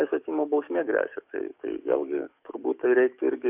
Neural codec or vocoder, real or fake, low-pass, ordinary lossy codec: codec, 44.1 kHz, 7.8 kbps, DAC; fake; 3.6 kHz; AAC, 32 kbps